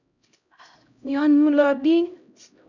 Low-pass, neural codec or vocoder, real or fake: 7.2 kHz; codec, 16 kHz, 0.5 kbps, X-Codec, HuBERT features, trained on LibriSpeech; fake